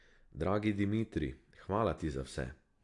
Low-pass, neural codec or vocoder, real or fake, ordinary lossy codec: 10.8 kHz; none; real; AAC, 48 kbps